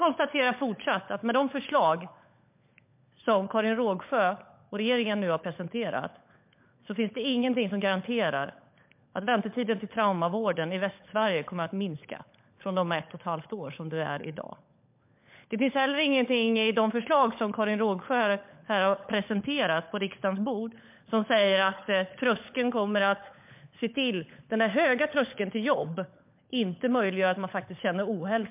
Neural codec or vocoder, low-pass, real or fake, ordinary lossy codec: codec, 16 kHz, 16 kbps, FunCodec, trained on LibriTTS, 50 frames a second; 3.6 kHz; fake; MP3, 32 kbps